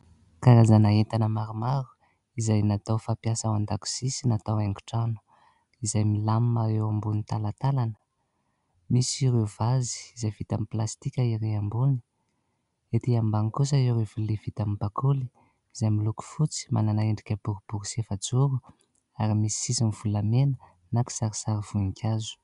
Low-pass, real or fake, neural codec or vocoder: 10.8 kHz; real; none